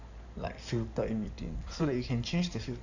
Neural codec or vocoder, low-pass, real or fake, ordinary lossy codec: codec, 16 kHz in and 24 kHz out, 2.2 kbps, FireRedTTS-2 codec; 7.2 kHz; fake; none